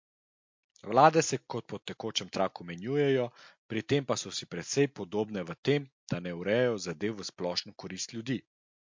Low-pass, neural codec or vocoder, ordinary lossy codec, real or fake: 7.2 kHz; none; MP3, 48 kbps; real